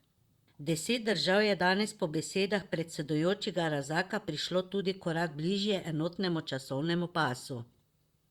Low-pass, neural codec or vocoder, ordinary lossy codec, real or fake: 19.8 kHz; vocoder, 44.1 kHz, 128 mel bands, Pupu-Vocoder; Opus, 64 kbps; fake